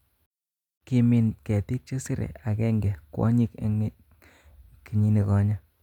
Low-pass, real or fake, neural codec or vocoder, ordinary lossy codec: 19.8 kHz; real; none; none